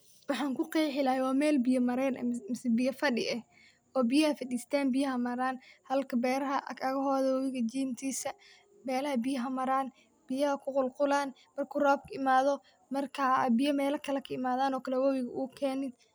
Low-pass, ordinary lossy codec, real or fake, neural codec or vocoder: none; none; real; none